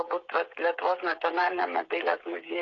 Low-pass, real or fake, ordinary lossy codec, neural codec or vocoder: 7.2 kHz; fake; AAC, 32 kbps; codec, 16 kHz, 16 kbps, FreqCodec, smaller model